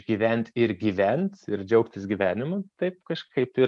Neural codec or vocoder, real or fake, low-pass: none; real; 10.8 kHz